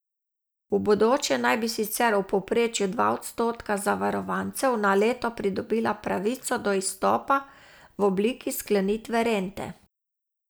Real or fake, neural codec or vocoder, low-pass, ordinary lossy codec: real; none; none; none